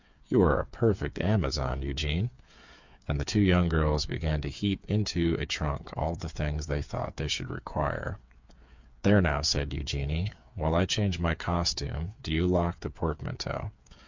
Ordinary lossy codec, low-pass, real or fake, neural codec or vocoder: MP3, 64 kbps; 7.2 kHz; fake; codec, 16 kHz, 8 kbps, FreqCodec, smaller model